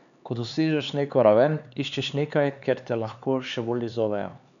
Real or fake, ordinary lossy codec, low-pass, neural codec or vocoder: fake; none; 7.2 kHz; codec, 16 kHz, 4 kbps, X-Codec, HuBERT features, trained on LibriSpeech